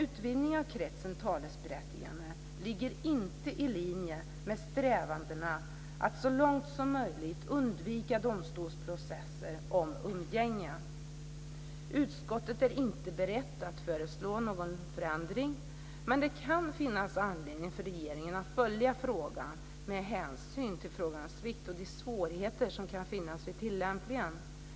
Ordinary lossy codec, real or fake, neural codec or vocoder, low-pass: none; real; none; none